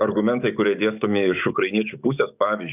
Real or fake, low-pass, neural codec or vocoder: fake; 3.6 kHz; codec, 44.1 kHz, 7.8 kbps, DAC